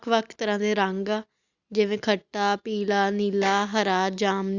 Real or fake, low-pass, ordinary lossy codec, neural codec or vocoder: real; 7.2 kHz; Opus, 64 kbps; none